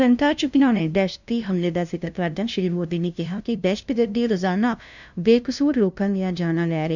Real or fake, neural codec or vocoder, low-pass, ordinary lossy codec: fake; codec, 16 kHz, 0.5 kbps, FunCodec, trained on LibriTTS, 25 frames a second; 7.2 kHz; none